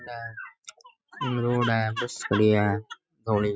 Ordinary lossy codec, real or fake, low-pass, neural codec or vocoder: none; real; none; none